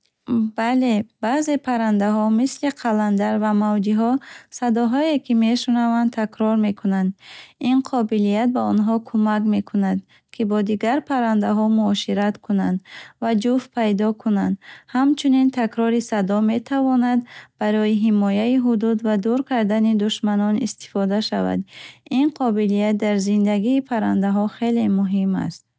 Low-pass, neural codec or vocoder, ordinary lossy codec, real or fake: none; none; none; real